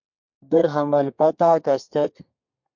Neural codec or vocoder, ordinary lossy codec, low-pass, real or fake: codec, 32 kHz, 1.9 kbps, SNAC; MP3, 64 kbps; 7.2 kHz; fake